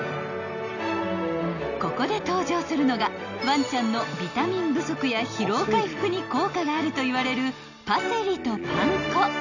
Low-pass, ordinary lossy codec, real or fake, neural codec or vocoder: 7.2 kHz; none; real; none